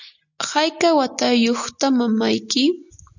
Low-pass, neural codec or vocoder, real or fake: 7.2 kHz; none; real